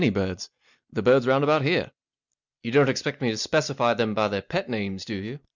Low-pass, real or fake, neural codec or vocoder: 7.2 kHz; real; none